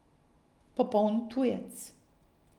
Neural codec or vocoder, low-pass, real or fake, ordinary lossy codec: none; 19.8 kHz; real; Opus, 32 kbps